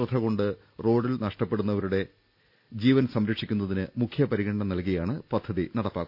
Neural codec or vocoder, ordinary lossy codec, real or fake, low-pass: none; none; real; 5.4 kHz